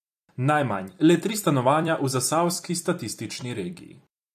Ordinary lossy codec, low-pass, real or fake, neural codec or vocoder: AAC, 96 kbps; 14.4 kHz; fake; vocoder, 44.1 kHz, 128 mel bands every 256 samples, BigVGAN v2